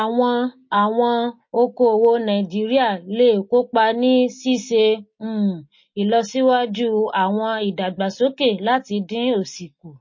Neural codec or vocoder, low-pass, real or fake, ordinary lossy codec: none; 7.2 kHz; real; MP3, 32 kbps